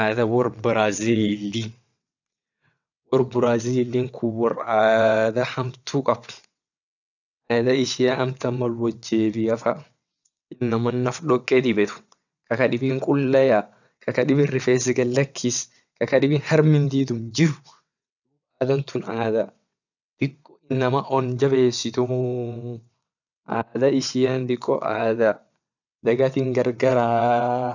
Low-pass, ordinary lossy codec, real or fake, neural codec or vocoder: 7.2 kHz; none; fake; vocoder, 22.05 kHz, 80 mel bands, WaveNeXt